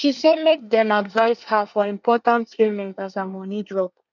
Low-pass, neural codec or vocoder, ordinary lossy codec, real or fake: 7.2 kHz; codec, 24 kHz, 1 kbps, SNAC; none; fake